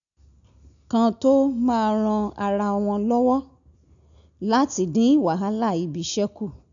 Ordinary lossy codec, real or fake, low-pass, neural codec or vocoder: none; real; 7.2 kHz; none